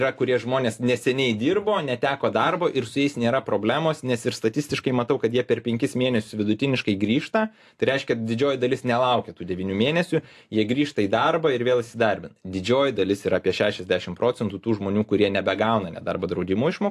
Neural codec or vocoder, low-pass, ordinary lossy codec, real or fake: none; 14.4 kHz; AAC, 64 kbps; real